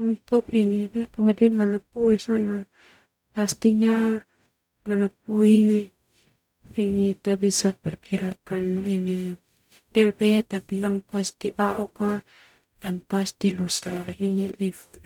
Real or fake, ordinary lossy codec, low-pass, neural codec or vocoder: fake; none; 19.8 kHz; codec, 44.1 kHz, 0.9 kbps, DAC